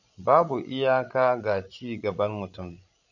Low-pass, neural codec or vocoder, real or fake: 7.2 kHz; codec, 16 kHz, 16 kbps, FreqCodec, larger model; fake